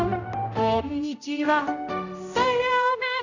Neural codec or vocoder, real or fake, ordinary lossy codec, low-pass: codec, 16 kHz, 0.5 kbps, X-Codec, HuBERT features, trained on general audio; fake; none; 7.2 kHz